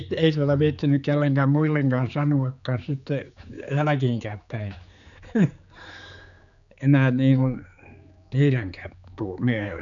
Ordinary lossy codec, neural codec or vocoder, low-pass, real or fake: none; codec, 16 kHz, 4 kbps, X-Codec, HuBERT features, trained on general audio; 7.2 kHz; fake